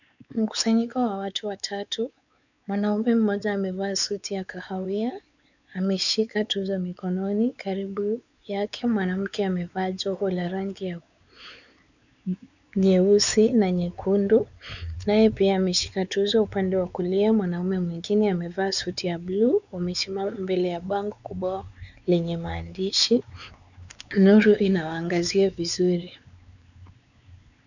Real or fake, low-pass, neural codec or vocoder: fake; 7.2 kHz; codec, 16 kHz, 4 kbps, X-Codec, WavLM features, trained on Multilingual LibriSpeech